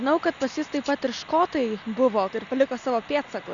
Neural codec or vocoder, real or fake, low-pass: none; real; 7.2 kHz